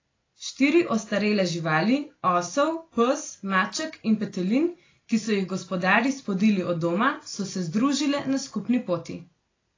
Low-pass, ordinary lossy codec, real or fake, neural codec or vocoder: 7.2 kHz; AAC, 32 kbps; real; none